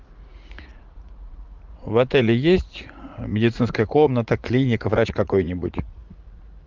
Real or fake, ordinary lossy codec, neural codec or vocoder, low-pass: fake; Opus, 32 kbps; vocoder, 44.1 kHz, 80 mel bands, Vocos; 7.2 kHz